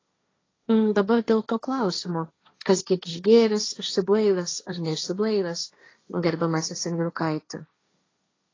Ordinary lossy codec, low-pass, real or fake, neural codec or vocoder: AAC, 32 kbps; 7.2 kHz; fake; codec, 16 kHz, 1.1 kbps, Voila-Tokenizer